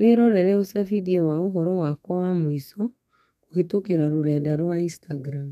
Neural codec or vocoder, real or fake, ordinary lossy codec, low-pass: codec, 32 kHz, 1.9 kbps, SNAC; fake; MP3, 96 kbps; 14.4 kHz